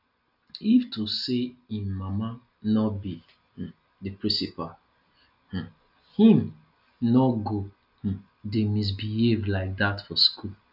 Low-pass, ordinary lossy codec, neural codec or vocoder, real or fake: 5.4 kHz; none; none; real